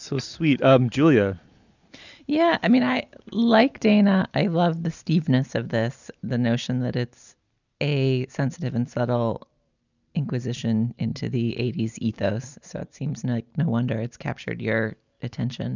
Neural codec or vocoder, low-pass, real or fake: none; 7.2 kHz; real